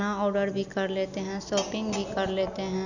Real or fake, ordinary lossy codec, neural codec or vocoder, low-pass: real; none; none; 7.2 kHz